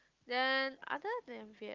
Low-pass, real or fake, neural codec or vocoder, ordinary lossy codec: 7.2 kHz; real; none; Opus, 24 kbps